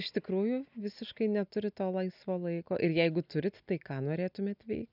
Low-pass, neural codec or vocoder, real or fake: 5.4 kHz; none; real